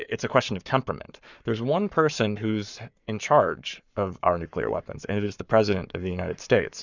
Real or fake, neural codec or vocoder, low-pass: fake; codec, 44.1 kHz, 7.8 kbps, Pupu-Codec; 7.2 kHz